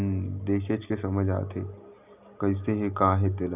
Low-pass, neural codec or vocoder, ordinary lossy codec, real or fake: 3.6 kHz; none; none; real